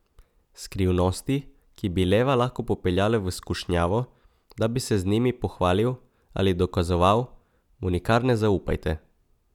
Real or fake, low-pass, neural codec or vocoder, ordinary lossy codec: real; 19.8 kHz; none; none